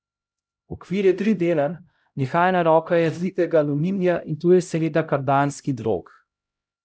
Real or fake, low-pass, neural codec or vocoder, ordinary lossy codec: fake; none; codec, 16 kHz, 0.5 kbps, X-Codec, HuBERT features, trained on LibriSpeech; none